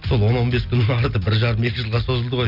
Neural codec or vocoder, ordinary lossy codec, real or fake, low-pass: none; none; real; 5.4 kHz